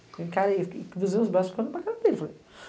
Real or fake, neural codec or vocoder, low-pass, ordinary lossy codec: real; none; none; none